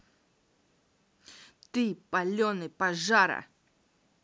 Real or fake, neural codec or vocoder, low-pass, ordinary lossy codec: real; none; none; none